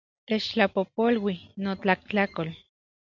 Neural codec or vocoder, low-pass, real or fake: none; 7.2 kHz; real